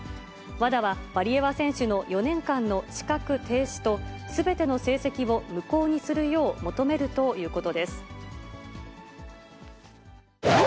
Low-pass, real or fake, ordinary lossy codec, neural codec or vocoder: none; real; none; none